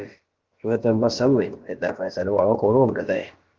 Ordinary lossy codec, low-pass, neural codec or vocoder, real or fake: Opus, 16 kbps; 7.2 kHz; codec, 16 kHz, about 1 kbps, DyCAST, with the encoder's durations; fake